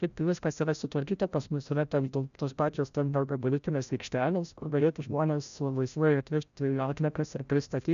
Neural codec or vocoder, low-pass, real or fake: codec, 16 kHz, 0.5 kbps, FreqCodec, larger model; 7.2 kHz; fake